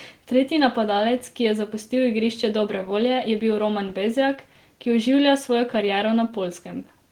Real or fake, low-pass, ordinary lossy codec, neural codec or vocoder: real; 19.8 kHz; Opus, 16 kbps; none